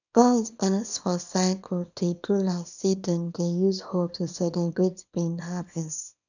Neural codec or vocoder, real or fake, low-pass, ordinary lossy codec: codec, 24 kHz, 0.9 kbps, WavTokenizer, small release; fake; 7.2 kHz; none